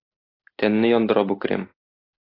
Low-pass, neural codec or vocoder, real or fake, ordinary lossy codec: 5.4 kHz; none; real; AAC, 24 kbps